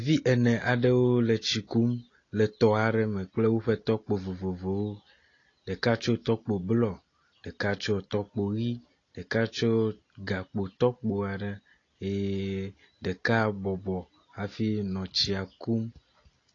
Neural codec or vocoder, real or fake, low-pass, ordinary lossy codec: none; real; 7.2 kHz; AAC, 32 kbps